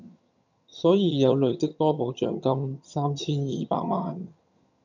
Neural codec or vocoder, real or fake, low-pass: vocoder, 22.05 kHz, 80 mel bands, HiFi-GAN; fake; 7.2 kHz